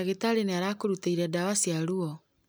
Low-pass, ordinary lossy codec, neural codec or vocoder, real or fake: none; none; none; real